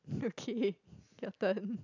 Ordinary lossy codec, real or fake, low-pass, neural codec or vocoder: none; real; 7.2 kHz; none